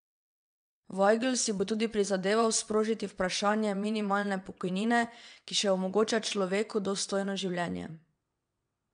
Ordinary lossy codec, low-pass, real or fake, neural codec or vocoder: MP3, 96 kbps; 9.9 kHz; fake; vocoder, 22.05 kHz, 80 mel bands, WaveNeXt